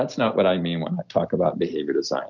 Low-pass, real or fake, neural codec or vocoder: 7.2 kHz; real; none